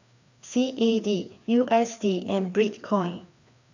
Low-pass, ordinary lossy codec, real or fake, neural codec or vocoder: 7.2 kHz; none; fake; codec, 16 kHz, 2 kbps, FreqCodec, larger model